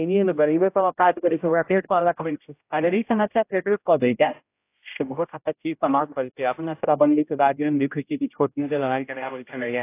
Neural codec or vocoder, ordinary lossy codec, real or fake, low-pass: codec, 16 kHz, 0.5 kbps, X-Codec, HuBERT features, trained on general audio; AAC, 24 kbps; fake; 3.6 kHz